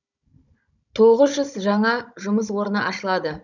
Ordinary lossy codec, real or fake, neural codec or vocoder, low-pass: none; fake; codec, 16 kHz, 16 kbps, FunCodec, trained on Chinese and English, 50 frames a second; 7.2 kHz